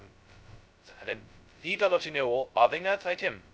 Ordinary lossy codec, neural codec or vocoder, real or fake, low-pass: none; codec, 16 kHz, 0.2 kbps, FocalCodec; fake; none